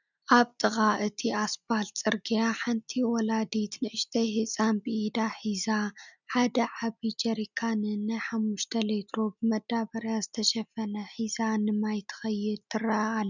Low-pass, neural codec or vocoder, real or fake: 7.2 kHz; none; real